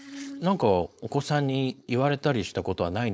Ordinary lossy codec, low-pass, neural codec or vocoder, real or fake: none; none; codec, 16 kHz, 4.8 kbps, FACodec; fake